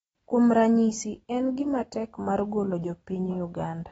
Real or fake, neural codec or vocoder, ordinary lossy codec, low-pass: real; none; AAC, 24 kbps; 10.8 kHz